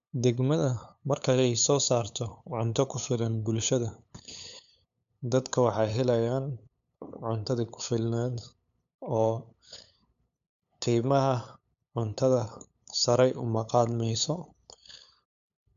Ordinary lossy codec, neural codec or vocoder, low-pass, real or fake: none; codec, 16 kHz, 8 kbps, FunCodec, trained on LibriTTS, 25 frames a second; 7.2 kHz; fake